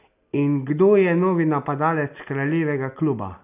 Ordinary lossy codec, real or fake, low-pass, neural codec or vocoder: none; real; 3.6 kHz; none